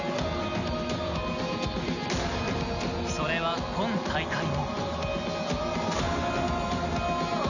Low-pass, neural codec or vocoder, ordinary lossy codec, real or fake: 7.2 kHz; none; none; real